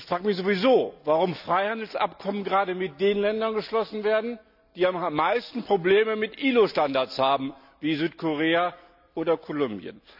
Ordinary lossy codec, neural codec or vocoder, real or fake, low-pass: none; none; real; 5.4 kHz